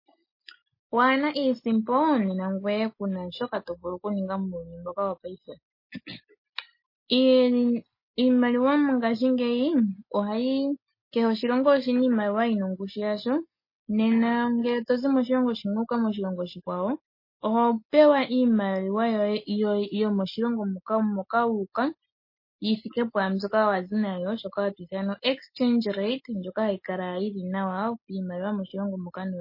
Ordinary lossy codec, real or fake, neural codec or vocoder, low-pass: MP3, 24 kbps; real; none; 5.4 kHz